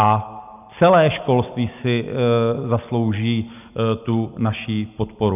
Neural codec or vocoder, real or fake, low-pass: none; real; 3.6 kHz